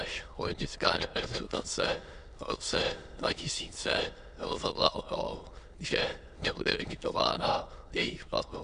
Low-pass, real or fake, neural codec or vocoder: 9.9 kHz; fake; autoencoder, 22.05 kHz, a latent of 192 numbers a frame, VITS, trained on many speakers